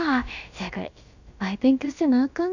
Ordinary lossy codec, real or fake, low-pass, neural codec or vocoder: none; fake; 7.2 kHz; codec, 16 kHz, about 1 kbps, DyCAST, with the encoder's durations